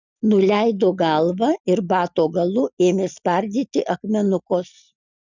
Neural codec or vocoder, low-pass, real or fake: none; 7.2 kHz; real